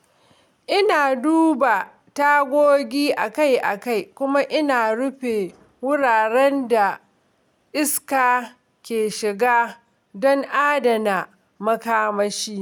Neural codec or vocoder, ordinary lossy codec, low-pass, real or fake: none; none; 19.8 kHz; real